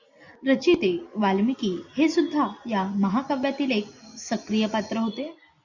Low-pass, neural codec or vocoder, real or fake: 7.2 kHz; none; real